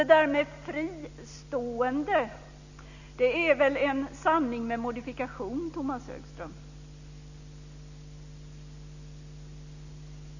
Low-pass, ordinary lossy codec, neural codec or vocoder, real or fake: 7.2 kHz; none; none; real